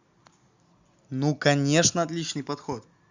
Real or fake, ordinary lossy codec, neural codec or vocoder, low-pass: real; Opus, 64 kbps; none; 7.2 kHz